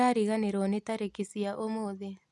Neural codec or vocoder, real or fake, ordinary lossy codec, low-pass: none; real; none; none